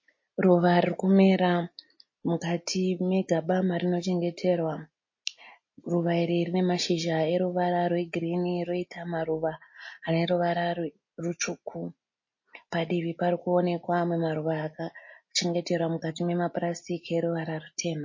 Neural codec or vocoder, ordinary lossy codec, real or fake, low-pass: none; MP3, 32 kbps; real; 7.2 kHz